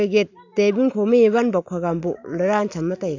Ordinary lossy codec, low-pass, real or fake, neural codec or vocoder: AAC, 48 kbps; 7.2 kHz; real; none